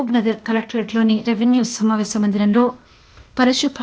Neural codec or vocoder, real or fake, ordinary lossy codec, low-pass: codec, 16 kHz, 0.8 kbps, ZipCodec; fake; none; none